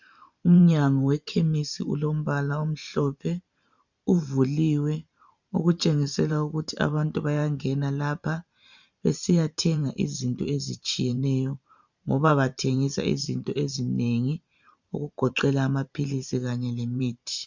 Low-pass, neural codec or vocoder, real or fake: 7.2 kHz; vocoder, 44.1 kHz, 128 mel bands every 512 samples, BigVGAN v2; fake